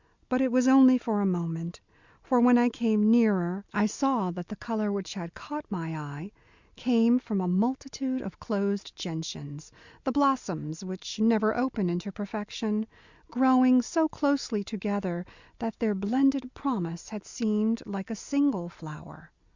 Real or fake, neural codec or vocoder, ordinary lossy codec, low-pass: real; none; Opus, 64 kbps; 7.2 kHz